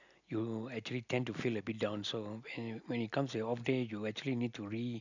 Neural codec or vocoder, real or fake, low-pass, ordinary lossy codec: none; real; 7.2 kHz; none